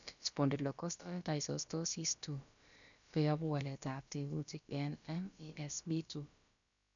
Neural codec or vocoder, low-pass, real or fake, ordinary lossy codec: codec, 16 kHz, about 1 kbps, DyCAST, with the encoder's durations; 7.2 kHz; fake; none